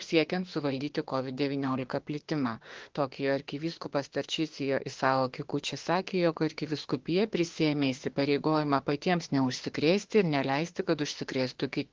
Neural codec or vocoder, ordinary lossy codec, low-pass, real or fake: autoencoder, 48 kHz, 32 numbers a frame, DAC-VAE, trained on Japanese speech; Opus, 16 kbps; 7.2 kHz; fake